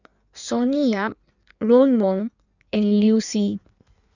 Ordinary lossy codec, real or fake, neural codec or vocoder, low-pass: none; fake; codec, 16 kHz in and 24 kHz out, 1.1 kbps, FireRedTTS-2 codec; 7.2 kHz